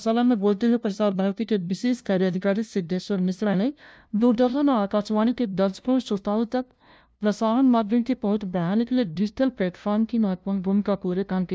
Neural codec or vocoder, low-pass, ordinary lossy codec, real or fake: codec, 16 kHz, 0.5 kbps, FunCodec, trained on LibriTTS, 25 frames a second; none; none; fake